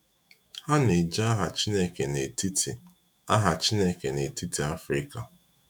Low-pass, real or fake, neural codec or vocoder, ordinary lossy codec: none; fake; autoencoder, 48 kHz, 128 numbers a frame, DAC-VAE, trained on Japanese speech; none